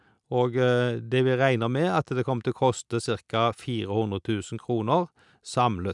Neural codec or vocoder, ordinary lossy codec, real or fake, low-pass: none; none; real; 10.8 kHz